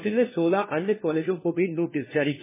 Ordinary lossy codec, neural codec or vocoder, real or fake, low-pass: MP3, 16 kbps; codec, 16 kHz, 1 kbps, FunCodec, trained on LibriTTS, 50 frames a second; fake; 3.6 kHz